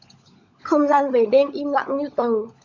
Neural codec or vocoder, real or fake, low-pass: codec, 16 kHz, 16 kbps, FunCodec, trained on LibriTTS, 50 frames a second; fake; 7.2 kHz